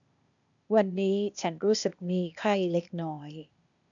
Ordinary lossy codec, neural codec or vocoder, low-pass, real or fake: none; codec, 16 kHz, 0.8 kbps, ZipCodec; 7.2 kHz; fake